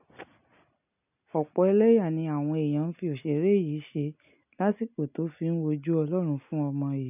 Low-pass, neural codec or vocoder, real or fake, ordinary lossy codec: 3.6 kHz; none; real; none